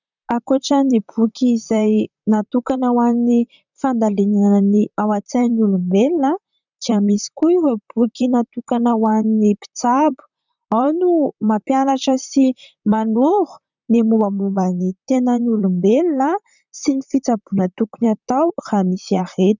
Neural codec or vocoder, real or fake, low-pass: vocoder, 44.1 kHz, 128 mel bands, Pupu-Vocoder; fake; 7.2 kHz